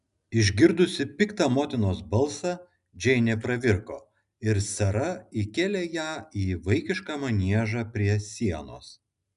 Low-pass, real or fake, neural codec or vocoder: 10.8 kHz; real; none